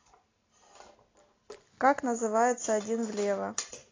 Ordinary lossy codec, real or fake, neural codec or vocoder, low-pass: AAC, 32 kbps; real; none; 7.2 kHz